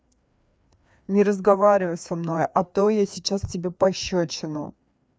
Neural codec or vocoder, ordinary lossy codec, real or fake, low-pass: codec, 16 kHz, 2 kbps, FreqCodec, larger model; none; fake; none